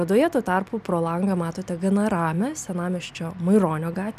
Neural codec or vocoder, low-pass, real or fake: none; 14.4 kHz; real